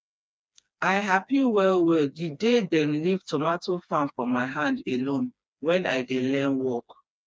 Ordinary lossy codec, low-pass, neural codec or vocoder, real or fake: none; none; codec, 16 kHz, 2 kbps, FreqCodec, smaller model; fake